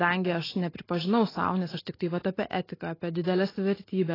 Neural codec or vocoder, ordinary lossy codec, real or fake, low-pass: none; AAC, 24 kbps; real; 5.4 kHz